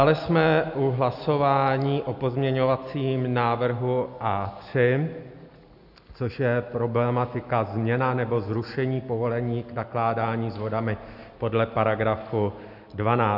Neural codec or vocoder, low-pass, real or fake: none; 5.4 kHz; real